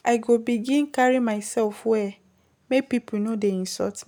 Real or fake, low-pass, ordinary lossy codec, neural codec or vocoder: real; none; none; none